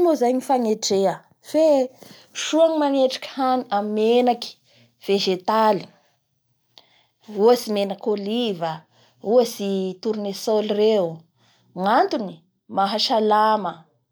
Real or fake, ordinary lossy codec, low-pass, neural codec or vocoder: real; none; none; none